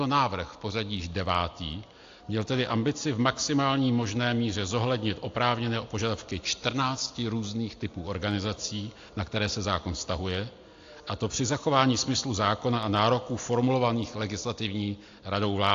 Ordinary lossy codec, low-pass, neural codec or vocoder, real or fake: AAC, 48 kbps; 7.2 kHz; none; real